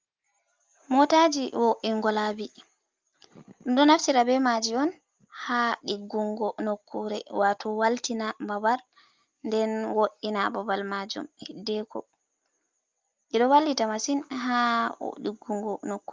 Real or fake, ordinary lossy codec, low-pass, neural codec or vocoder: real; Opus, 32 kbps; 7.2 kHz; none